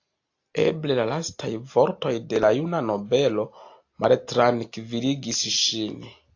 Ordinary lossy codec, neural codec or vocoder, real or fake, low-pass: AAC, 48 kbps; none; real; 7.2 kHz